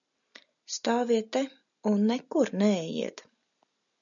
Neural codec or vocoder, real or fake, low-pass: none; real; 7.2 kHz